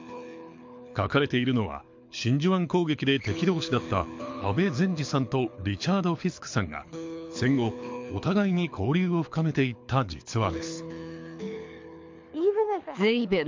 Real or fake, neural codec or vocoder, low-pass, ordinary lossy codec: fake; codec, 24 kHz, 6 kbps, HILCodec; 7.2 kHz; MP3, 48 kbps